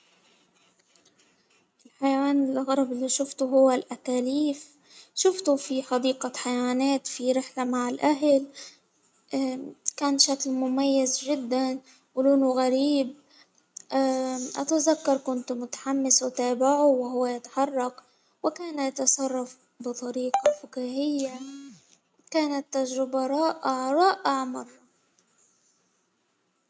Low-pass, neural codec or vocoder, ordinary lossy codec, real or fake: none; none; none; real